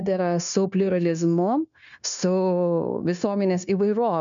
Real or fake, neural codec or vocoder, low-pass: fake; codec, 16 kHz, 0.9 kbps, LongCat-Audio-Codec; 7.2 kHz